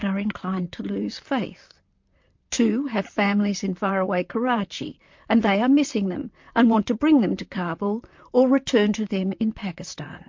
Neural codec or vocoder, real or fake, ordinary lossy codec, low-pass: vocoder, 44.1 kHz, 128 mel bands, Pupu-Vocoder; fake; MP3, 48 kbps; 7.2 kHz